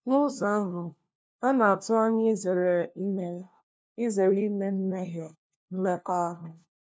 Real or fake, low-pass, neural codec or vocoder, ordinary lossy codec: fake; none; codec, 16 kHz, 1 kbps, FunCodec, trained on LibriTTS, 50 frames a second; none